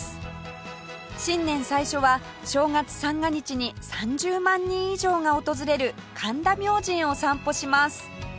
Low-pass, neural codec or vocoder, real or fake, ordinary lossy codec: none; none; real; none